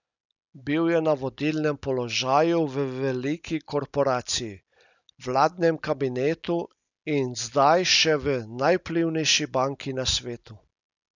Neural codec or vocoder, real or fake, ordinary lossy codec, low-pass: none; real; none; 7.2 kHz